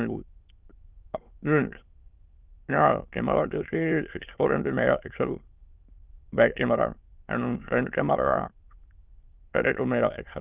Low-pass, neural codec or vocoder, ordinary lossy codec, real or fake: 3.6 kHz; autoencoder, 22.05 kHz, a latent of 192 numbers a frame, VITS, trained on many speakers; Opus, 32 kbps; fake